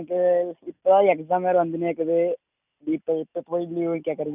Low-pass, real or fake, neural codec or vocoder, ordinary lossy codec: 3.6 kHz; real; none; none